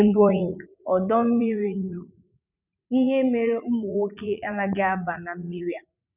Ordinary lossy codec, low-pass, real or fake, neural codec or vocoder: none; 3.6 kHz; fake; vocoder, 44.1 kHz, 80 mel bands, Vocos